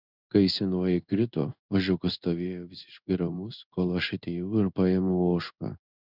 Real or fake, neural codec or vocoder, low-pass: fake; codec, 16 kHz in and 24 kHz out, 1 kbps, XY-Tokenizer; 5.4 kHz